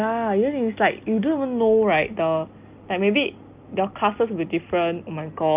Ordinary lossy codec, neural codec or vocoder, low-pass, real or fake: Opus, 24 kbps; none; 3.6 kHz; real